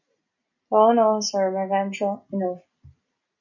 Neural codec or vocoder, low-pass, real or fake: none; 7.2 kHz; real